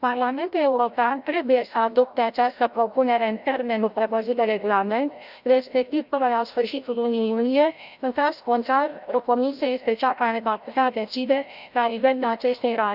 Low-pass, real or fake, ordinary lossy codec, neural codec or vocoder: 5.4 kHz; fake; Opus, 64 kbps; codec, 16 kHz, 0.5 kbps, FreqCodec, larger model